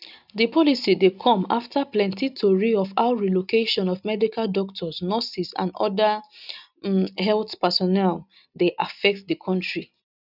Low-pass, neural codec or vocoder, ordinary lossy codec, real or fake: 5.4 kHz; none; none; real